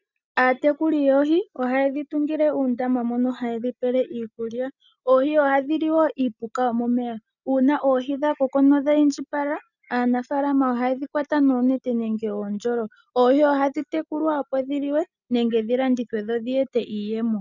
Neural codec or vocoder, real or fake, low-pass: none; real; 7.2 kHz